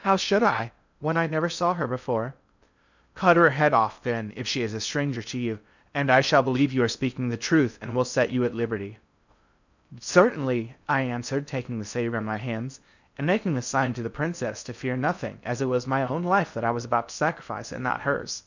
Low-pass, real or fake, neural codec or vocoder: 7.2 kHz; fake; codec, 16 kHz in and 24 kHz out, 0.6 kbps, FocalCodec, streaming, 2048 codes